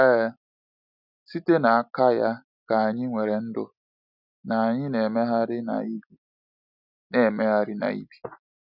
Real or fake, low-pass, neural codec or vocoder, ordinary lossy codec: real; 5.4 kHz; none; none